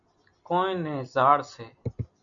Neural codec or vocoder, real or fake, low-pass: none; real; 7.2 kHz